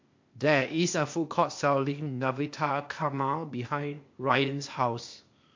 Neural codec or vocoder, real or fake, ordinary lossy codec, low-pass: codec, 16 kHz, 0.8 kbps, ZipCodec; fake; MP3, 48 kbps; 7.2 kHz